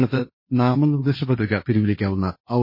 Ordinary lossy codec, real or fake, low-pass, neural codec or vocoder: MP3, 24 kbps; fake; 5.4 kHz; codec, 16 kHz, 1.1 kbps, Voila-Tokenizer